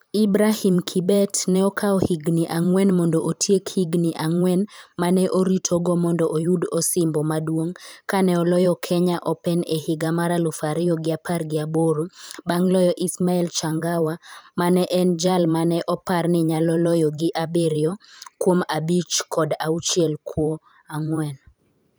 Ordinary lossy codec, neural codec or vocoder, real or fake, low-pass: none; vocoder, 44.1 kHz, 128 mel bands every 512 samples, BigVGAN v2; fake; none